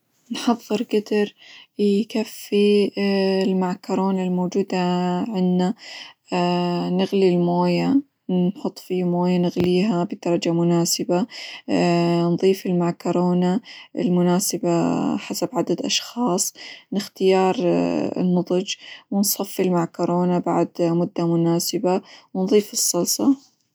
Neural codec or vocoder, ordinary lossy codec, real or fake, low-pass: none; none; real; none